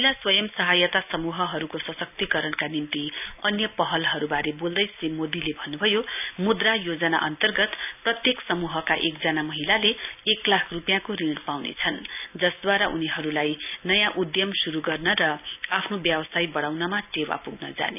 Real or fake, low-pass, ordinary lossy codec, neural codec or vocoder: real; 3.6 kHz; none; none